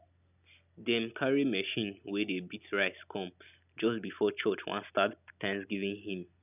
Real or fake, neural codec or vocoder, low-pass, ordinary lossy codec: real; none; 3.6 kHz; none